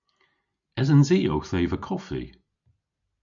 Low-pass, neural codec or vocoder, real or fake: 7.2 kHz; none; real